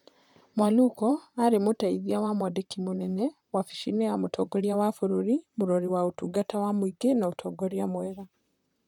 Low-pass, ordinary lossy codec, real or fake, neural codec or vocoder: 19.8 kHz; none; fake; vocoder, 44.1 kHz, 128 mel bands, Pupu-Vocoder